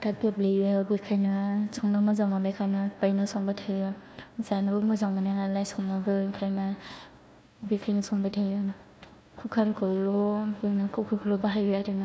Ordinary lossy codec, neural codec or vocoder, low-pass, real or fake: none; codec, 16 kHz, 1 kbps, FunCodec, trained on Chinese and English, 50 frames a second; none; fake